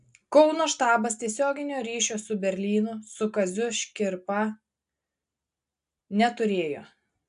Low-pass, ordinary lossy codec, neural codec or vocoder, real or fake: 10.8 kHz; AAC, 96 kbps; none; real